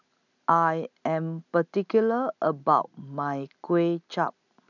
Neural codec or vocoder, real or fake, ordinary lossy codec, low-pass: none; real; none; 7.2 kHz